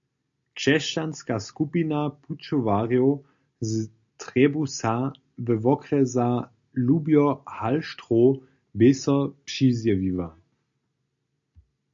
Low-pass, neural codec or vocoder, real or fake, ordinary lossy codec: 7.2 kHz; none; real; AAC, 64 kbps